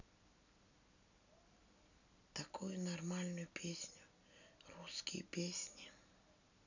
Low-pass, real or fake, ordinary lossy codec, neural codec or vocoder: 7.2 kHz; real; none; none